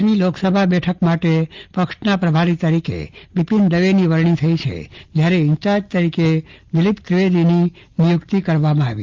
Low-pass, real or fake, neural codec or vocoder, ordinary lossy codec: 7.2 kHz; real; none; Opus, 32 kbps